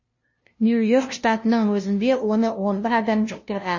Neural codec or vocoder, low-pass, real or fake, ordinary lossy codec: codec, 16 kHz, 0.5 kbps, FunCodec, trained on LibriTTS, 25 frames a second; 7.2 kHz; fake; MP3, 32 kbps